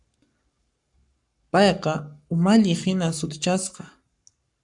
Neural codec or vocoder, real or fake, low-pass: codec, 44.1 kHz, 7.8 kbps, Pupu-Codec; fake; 10.8 kHz